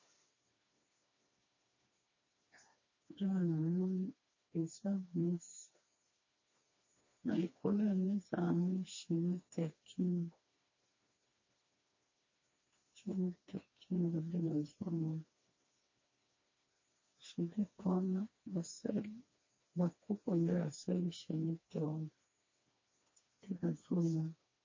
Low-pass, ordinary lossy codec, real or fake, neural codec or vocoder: 7.2 kHz; MP3, 32 kbps; fake; codec, 16 kHz, 2 kbps, FreqCodec, smaller model